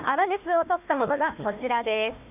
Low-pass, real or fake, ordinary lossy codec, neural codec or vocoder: 3.6 kHz; fake; none; codec, 16 kHz, 1 kbps, FunCodec, trained on Chinese and English, 50 frames a second